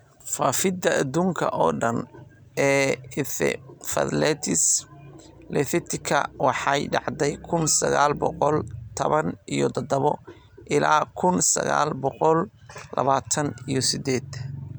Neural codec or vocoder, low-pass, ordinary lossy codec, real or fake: none; none; none; real